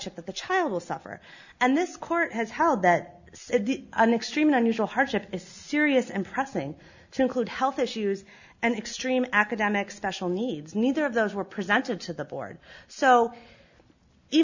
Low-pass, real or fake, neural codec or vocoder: 7.2 kHz; real; none